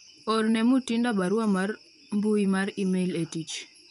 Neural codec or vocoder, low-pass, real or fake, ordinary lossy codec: vocoder, 24 kHz, 100 mel bands, Vocos; 10.8 kHz; fake; none